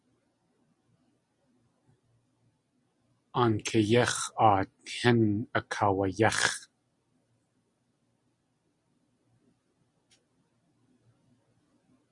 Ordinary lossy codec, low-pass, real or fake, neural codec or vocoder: Opus, 64 kbps; 10.8 kHz; real; none